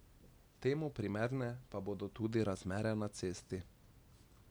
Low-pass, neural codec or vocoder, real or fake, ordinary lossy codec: none; none; real; none